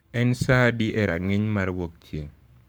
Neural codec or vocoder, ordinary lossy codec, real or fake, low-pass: codec, 44.1 kHz, 7.8 kbps, Pupu-Codec; none; fake; none